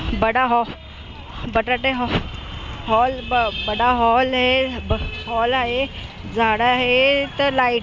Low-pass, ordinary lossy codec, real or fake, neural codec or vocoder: none; none; real; none